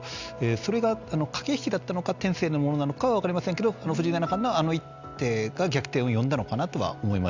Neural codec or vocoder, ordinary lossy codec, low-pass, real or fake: none; Opus, 64 kbps; 7.2 kHz; real